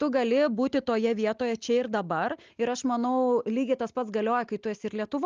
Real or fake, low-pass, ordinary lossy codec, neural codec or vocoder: real; 7.2 kHz; Opus, 24 kbps; none